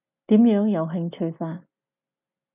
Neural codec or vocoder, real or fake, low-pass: none; real; 3.6 kHz